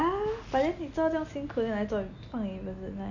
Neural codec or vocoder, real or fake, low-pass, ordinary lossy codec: none; real; 7.2 kHz; none